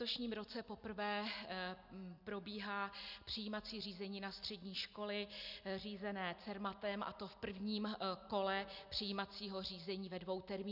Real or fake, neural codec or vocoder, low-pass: real; none; 5.4 kHz